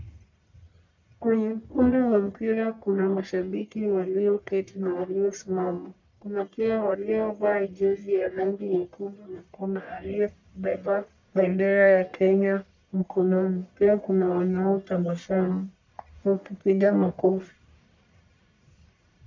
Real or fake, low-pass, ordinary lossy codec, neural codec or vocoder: fake; 7.2 kHz; MP3, 64 kbps; codec, 44.1 kHz, 1.7 kbps, Pupu-Codec